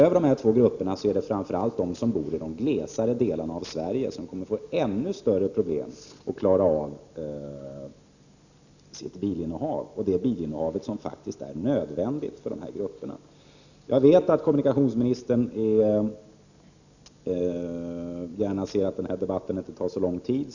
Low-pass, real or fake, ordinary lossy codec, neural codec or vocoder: 7.2 kHz; real; none; none